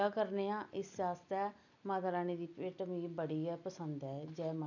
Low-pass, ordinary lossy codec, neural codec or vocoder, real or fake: 7.2 kHz; none; none; real